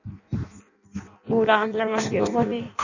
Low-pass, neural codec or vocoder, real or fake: 7.2 kHz; codec, 16 kHz in and 24 kHz out, 0.6 kbps, FireRedTTS-2 codec; fake